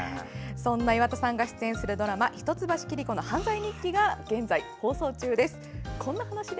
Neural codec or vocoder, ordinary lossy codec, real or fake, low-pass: none; none; real; none